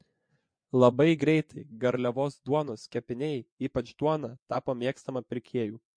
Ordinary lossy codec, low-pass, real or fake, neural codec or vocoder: MP3, 48 kbps; 9.9 kHz; fake; vocoder, 24 kHz, 100 mel bands, Vocos